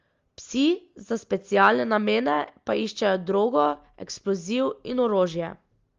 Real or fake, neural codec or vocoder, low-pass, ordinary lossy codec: real; none; 7.2 kHz; Opus, 32 kbps